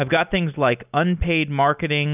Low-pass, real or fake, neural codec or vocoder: 3.6 kHz; real; none